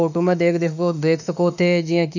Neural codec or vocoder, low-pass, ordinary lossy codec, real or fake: autoencoder, 48 kHz, 32 numbers a frame, DAC-VAE, trained on Japanese speech; 7.2 kHz; none; fake